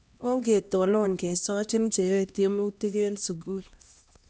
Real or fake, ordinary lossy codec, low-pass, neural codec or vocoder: fake; none; none; codec, 16 kHz, 1 kbps, X-Codec, HuBERT features, trained on LibriSpeech